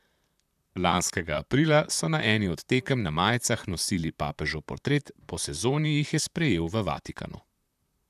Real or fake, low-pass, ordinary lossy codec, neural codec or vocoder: fake; 14.4 kHz; none; vocoder, 44.1 kHz, 128 mel bands, Pupu-Vocoder